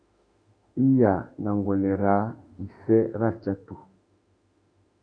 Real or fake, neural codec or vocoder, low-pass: fake; autoencoder, 48 kHz, 32 numbers a frame, DAC-VAE, trained on Japanese speech; 9.9 kHz